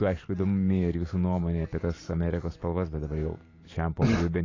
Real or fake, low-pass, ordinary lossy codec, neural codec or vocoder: fake; 7.2 kHz; AAC, 32 kbps; vocoder, 44.1 kHz, 128 mel bands every 512 samples, BigVGAN v2